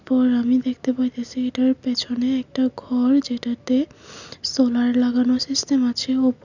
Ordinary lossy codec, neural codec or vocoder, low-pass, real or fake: none; none; 7.2 kHz; real